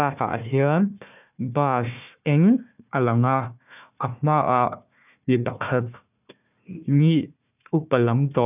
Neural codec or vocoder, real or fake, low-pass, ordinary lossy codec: codec, 16 kHz, 1 kbps, FunCodec, trained on Chinese and English, 50 frames a second; fake; 3.6 kHz; none